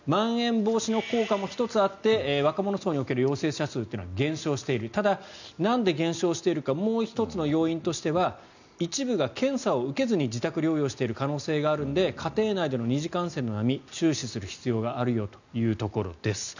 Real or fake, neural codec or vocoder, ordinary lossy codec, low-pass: real; none; none; 7.2 kHz